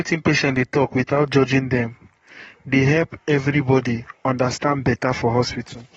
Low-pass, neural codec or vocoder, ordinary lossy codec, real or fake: 19.8 kHz; codec, 44.1 kHz, 7.8 kbps, Pupu-Codec; AAC, 24 kbps; fake